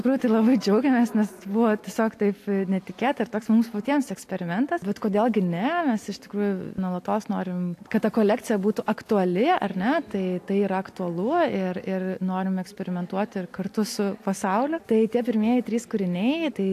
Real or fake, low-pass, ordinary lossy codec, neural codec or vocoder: real; 14.4 kHz; AAC, 64 kbps; none